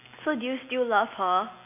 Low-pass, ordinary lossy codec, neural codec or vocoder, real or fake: 3.6 kHz; none; none; real